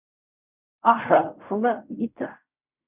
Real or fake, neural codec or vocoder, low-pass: fake; codec, 16 kHz in and 24 kHz out, 0.4 kbps, LongCat-Audio-Codec, fine tuned four codebook decoder; 3.6 kHz